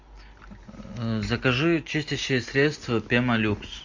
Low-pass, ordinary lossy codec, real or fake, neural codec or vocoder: 7.2 kHz; MP3, 64 kbps; real; none